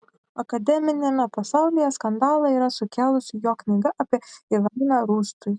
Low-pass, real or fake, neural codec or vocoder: 9.9 kHz; real; none